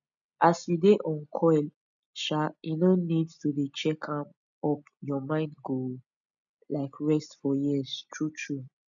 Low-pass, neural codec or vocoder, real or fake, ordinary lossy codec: 7.2 kHz; none; real; none